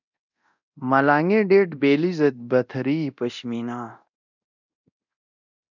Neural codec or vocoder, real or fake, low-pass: codec, 24 kHz, 0.9 kbps, DualCodec; fake; 7.2 kHz